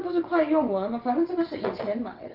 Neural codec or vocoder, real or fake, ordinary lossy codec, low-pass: vocoder, 22.05 kHz, 80 mel bands, WaveNeXt; fake; Opus, 24 kbps; 5.4 kHz